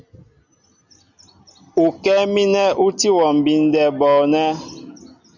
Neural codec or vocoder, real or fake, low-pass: none; real; 7.2 kHz